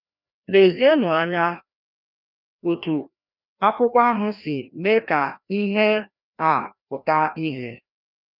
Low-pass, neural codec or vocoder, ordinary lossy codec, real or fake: 5.4 kHz; codec, 16 kHz, 1 kbps, FreqCodec, larger model; none; fake